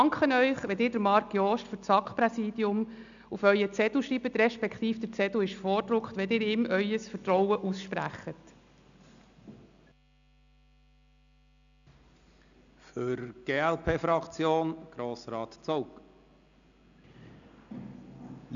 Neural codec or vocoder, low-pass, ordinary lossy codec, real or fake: none; 7.2 kHz; none; real